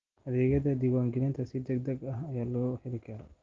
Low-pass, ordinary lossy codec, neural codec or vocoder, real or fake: 7.2 kHz; Opus, 16 kbps; none; real